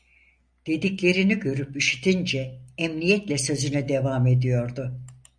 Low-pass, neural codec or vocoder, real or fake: 9.9 kHz; none; real